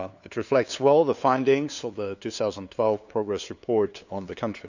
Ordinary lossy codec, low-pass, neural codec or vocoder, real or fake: none; 7.2 kHz; codec, 16 kHz, 2 kbps, FunCodec, trained on LibriTTS, 25 frames a second; fake